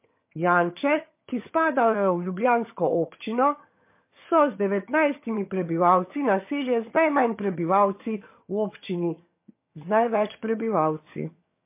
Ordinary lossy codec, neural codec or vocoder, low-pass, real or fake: MP3, 24 kbps; vocoder, 22.05 kHz, 80 mel bands, HiFi-GAN; 3.6 kHz; fake